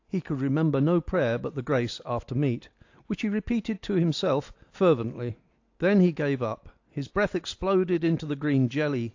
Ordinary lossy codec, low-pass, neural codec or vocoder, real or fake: AAC, 48 kbps; 7.2 kHz; none; real